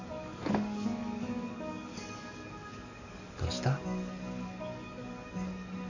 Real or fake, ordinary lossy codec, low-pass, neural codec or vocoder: fake; none; 7.2 kHz; codec, 44.1 kHz, 7.8 kbps, DAC